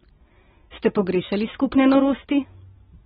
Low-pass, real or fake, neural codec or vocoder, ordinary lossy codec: 7.2 kHz; real; none; AAC, 16 kbps